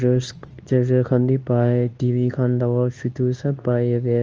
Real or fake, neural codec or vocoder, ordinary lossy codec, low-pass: fake; codec, 24 kHz, 0.9 kbps, WavTokenizer, medium speech release version 2; Opus, 24 kbps; 7.2 kHz